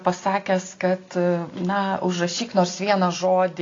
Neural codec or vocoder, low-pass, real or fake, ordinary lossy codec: none; 7.2 kHz; real; AAC, 32 kbps